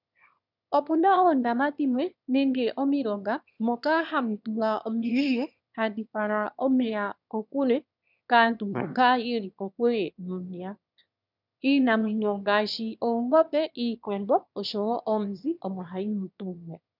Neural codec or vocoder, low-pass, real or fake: autoencoder, 22.05 kHz, a latent of 192 numbers a frame, VITS, trained on one speaker; 5.4 kHz; fake